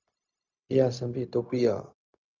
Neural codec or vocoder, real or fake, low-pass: codec, 16 kHz, 0.4 kbps, LongCat-Audio-Codec; fake; 7.2 kHz